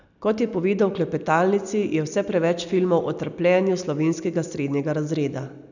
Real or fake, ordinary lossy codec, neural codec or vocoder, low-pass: real; none; none; 7.2 kHz